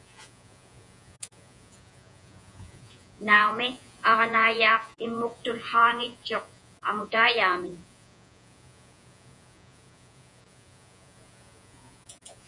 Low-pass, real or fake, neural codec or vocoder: 10.8 kHz; fake; vocoder, 48 kHz, 128 mel bands, Vocos